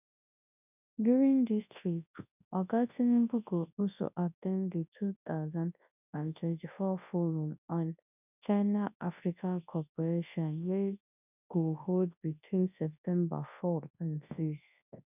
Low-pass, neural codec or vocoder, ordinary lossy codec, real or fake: 3.6 kHz; codec, 24 kHz, 0.9 kbps, WavTokenizer, large speech release; none; fake